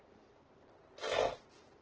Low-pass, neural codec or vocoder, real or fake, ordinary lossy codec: 7.2 kHz; none; real; Opus, 16 kbps